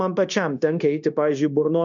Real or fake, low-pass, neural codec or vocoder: fake; 7.2 kHz; codec, 16 kHz, 0.9 kbps, LongCat-Audio-Codec